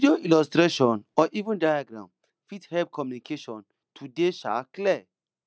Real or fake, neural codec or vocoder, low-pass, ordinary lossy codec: real; none; none; none